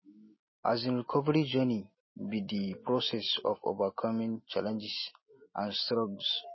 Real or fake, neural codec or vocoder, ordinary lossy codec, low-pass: real; none; MP3, 24 kbps; 7.2 kHz